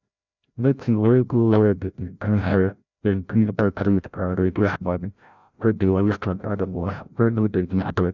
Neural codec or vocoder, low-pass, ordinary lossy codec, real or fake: codec, 16 kHz, 0.5 kbps, FreqCodec, larger model; 7.2 kHz; none; fake